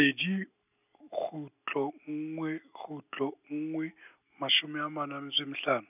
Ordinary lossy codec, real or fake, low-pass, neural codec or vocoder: none; real; 3.6 kHz; none